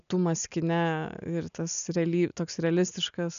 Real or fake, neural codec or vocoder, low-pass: real; none; 7.2 kHz